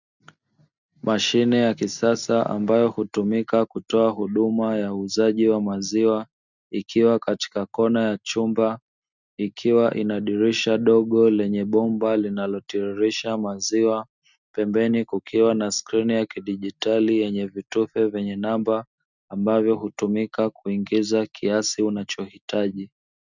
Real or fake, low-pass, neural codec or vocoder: real; 7.2 kHz; none